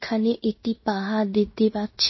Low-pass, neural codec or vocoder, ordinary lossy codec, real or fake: 7.2 kHz; codec, 16 kHz in and 24 kHz out, 0.9 kbps, LongCat-Audio-Codec, fine tuned four codebook decoder; MP3, 24 kbps; fake